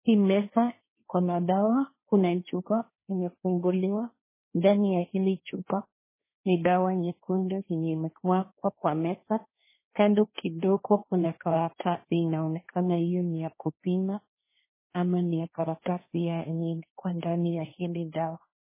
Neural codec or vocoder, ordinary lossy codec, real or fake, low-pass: codec, 16 kHz, 1.1 kbps, Voila-Tokenizer; MP3, 16 kbps; fake; 3.6 kHz